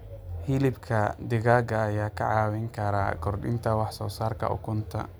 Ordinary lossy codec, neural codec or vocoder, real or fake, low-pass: none; none; real; none